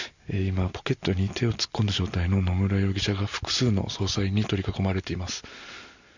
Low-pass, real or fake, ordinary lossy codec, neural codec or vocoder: 7.2 kHz; real; none; none